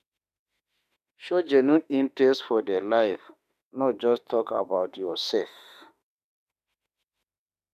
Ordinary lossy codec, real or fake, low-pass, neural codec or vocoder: none; fake; 14.4 kHz; autoencoder, 48 kHz, 32 numbers a frame, DAC-VAE, trained on Japanese speech